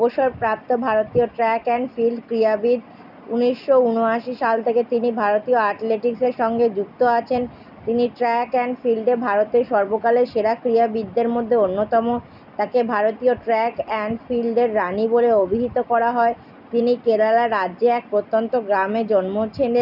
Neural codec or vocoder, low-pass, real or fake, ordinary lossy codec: none; 5.4 kHz; real; none